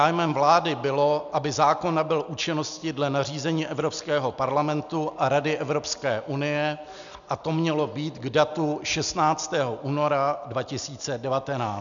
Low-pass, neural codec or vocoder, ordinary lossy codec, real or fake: 7.2 kHz; none; MP3, 96 kbps; real